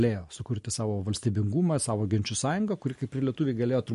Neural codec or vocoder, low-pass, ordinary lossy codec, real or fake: none; 14.4 kHz; MP3, 48 kbps; real